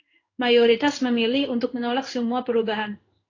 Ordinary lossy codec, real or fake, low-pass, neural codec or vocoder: AAC, 32 kbps; fake; 7.2 kHz; codec, 16 kHz in and 24 kHz out, 1 kbps, XY-Tokenizer